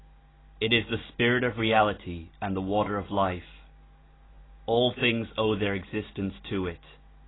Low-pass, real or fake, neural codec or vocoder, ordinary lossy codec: 7.2 kHz; real; none; AAC, 16 kbps